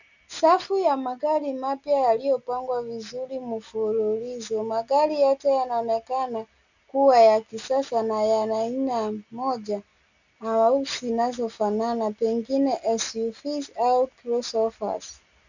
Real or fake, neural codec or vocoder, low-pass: real; none; 7.2 kHz